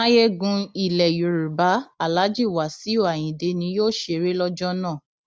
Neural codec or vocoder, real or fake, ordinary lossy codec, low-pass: none; real; none; none